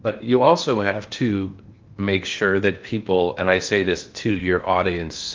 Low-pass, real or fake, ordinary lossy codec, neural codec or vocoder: 7.2 kHz; fake; Opus, 32 kbps; codec, 16 kHz in and 24 kHz out, 0.8 kbps, FocalCodec, streaming, 65536 codes